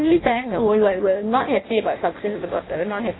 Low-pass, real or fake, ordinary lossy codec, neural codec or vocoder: 7.2 kHz; fake; AAC, 16 kbps; codec, 16 kHz in and 24 kHz out, 0.6 kbps, FireRedTTS-2 codec